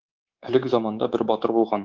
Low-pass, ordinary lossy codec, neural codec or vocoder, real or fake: 7.2 kHz; Opus, 16 kbps; codec, 24 kHz, 3.1 kbps, DualCodec; fake